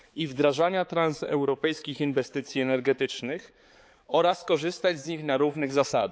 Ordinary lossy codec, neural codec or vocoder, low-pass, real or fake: none; codec, 16 kHz, 4 kbps, X-Codec, HuBERT features, trained on balanced general audio; none; fake